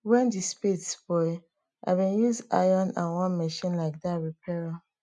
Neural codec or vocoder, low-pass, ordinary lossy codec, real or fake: none; 10.8 kHz; none; real